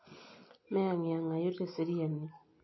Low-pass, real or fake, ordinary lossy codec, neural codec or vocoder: 7.2 kHz; real; MP3, 24 kbps; none